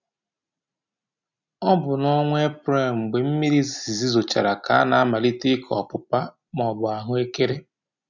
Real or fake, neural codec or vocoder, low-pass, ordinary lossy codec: real; none; 7.2 kHz; none